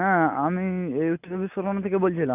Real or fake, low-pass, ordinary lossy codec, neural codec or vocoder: real; 3.6 kHz; none; none